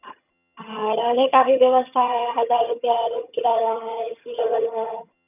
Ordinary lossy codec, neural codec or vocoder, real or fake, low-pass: none; vocoder, 22.05 kHz, 80 mel bands, HiFi-GAN; fake; 3.6 kHz